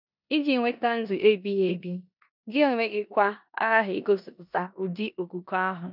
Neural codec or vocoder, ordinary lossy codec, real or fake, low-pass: codec, 16 kHz in and 24 kHz out, 0.9 kbps, LongCat-Audio-Codec, four codebook decoder; none; fake; 5.4 kHz